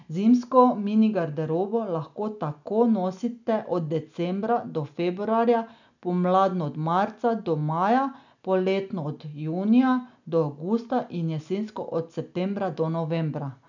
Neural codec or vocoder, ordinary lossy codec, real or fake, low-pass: none; none; real; 7.2 kHz